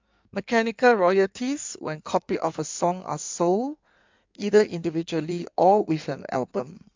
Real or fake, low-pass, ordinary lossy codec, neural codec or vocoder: fake; 7.2 kHz; MP3, 64 kbps; codec, 16 kHz in and 24 kHz out, 1.1 kbps, FireRedTTS-2 codec